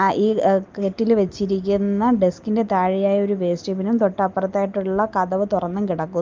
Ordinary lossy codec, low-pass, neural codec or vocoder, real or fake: Opus, 32 kbps; 7.2 kHz; none; real